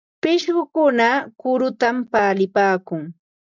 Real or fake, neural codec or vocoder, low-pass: real; none; 7.2 kHz